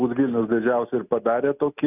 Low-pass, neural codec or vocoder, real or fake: 3.6 kHz; none; real